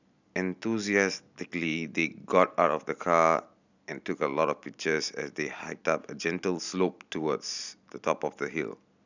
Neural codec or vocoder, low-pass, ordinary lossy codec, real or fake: none; 7.2 kHz; none; real